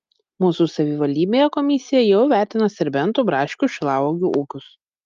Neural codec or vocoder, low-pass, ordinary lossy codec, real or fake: none; 7.2 kHz; Opus, 32 kbps; real